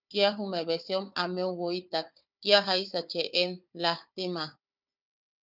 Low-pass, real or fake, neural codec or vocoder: 5.4 kHz; fake; codec, 16 kHz, 4 kbps, FunCodec, trained on Chinese and English, 50 frames a second